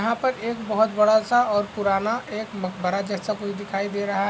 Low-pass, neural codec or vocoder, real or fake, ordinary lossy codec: none; none; real; none